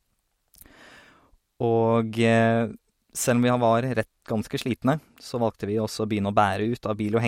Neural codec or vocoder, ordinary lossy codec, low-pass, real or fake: none; MP3, 64 kbps; 19.8 kHz; real